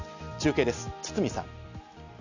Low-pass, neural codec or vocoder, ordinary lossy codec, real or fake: 7.2 kHz; none; none; real